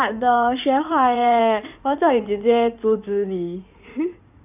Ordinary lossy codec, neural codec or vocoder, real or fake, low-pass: none; codec, 16 kHz in and 24 kHz out, 2.2 kbps, FireRedTTS-2 codec; fake; 3.6 kHz